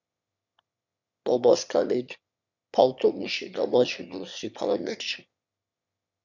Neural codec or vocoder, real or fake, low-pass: autoencoder, 22.05 kHz, a latent of 192 numbers a frame, VITS, trained on one speaker; fake; 7.2 kHz